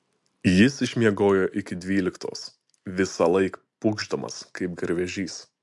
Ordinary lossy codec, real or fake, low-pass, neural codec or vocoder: MP3, 64 kbps; real; 10.8 kHz; none